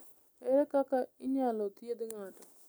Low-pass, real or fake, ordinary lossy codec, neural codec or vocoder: none; real; none; none